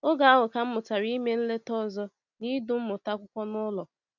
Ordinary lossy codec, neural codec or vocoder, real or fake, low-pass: none; none; real; 7.2 kHz